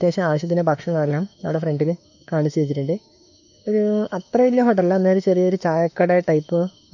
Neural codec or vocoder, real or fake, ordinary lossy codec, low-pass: autoencoder, 48 kHz, 32 numbers a frame, DAC-VAE, trained on Japanese speech; fake; none; 7.2 kHz